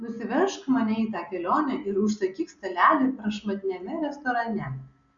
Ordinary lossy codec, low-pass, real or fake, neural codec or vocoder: Opus, 64 kbps; 7.2 kHz; real; none